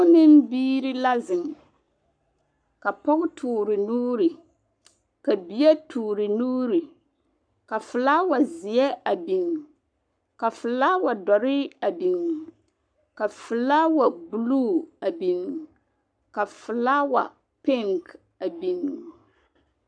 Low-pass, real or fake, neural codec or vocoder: 9.9 kHz; fake; codec, 44.1 kHz, 7.8 kbps, Pupu-Codec